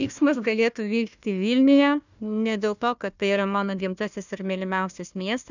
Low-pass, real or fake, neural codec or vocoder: 7.2 kHz; fake; codec, 16 kHz, 1 kbps, FunCodec, trained on Chinese and English, 50 frames a second